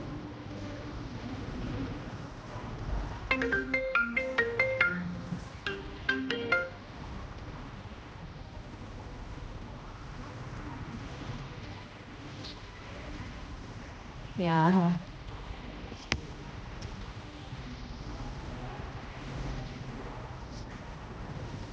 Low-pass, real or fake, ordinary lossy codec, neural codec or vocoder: none; fake; none; codec, 16 kHz, 1 kbps, X-Codec, HuBERT features, trained on general audio